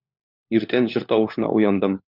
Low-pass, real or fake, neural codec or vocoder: 5.4 kHz; fake; codec, 16 kHz, 4 kbps, FunCodec, trained on LibriTTS, 50 frames a second